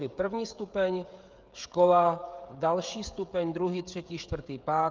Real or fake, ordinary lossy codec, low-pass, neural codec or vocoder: fake; Opus, 32 kbps; 7.2 kHz; codec, 16 kHz, 16 kbps, FreqCodec, smaller model